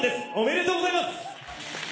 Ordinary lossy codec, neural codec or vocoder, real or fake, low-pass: none; none; real; none